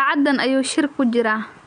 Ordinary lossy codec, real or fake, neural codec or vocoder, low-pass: MP3, 64 kbps; real; none; 9.9 kHz